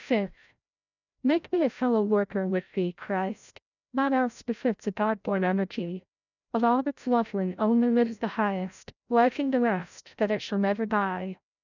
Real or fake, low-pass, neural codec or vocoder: fake; 7.2 kHz; codec, 16 kHz, 0.5 kbps, FreqCodec, larger model